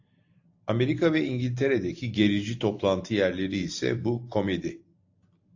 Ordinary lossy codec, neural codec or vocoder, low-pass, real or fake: AAC, 48 kbps; none; 7.2 kHz; real